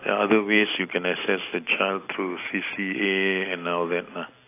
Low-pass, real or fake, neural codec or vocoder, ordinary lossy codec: 3.6 kHz; real; none; AAC, 24 kbps